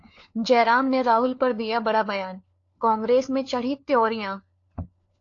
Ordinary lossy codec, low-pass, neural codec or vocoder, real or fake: AAC, 48 kbps; 7.2 kHz; codec, 16 kHz, 4 kbps, FunCodec, trained on LibriTTS, 50 frames a second; fake